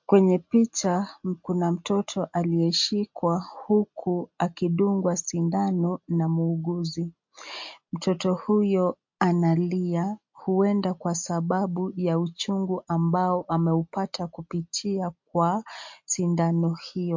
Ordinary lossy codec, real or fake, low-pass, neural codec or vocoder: MP3, 48 kbps; real; 7.2 kHz; none